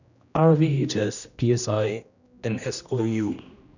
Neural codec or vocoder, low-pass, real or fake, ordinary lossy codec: codec, 16 kHz, 1 kbps, X-Codec, HuBERT features, trained on general audio; 7.2 kHz; fake; none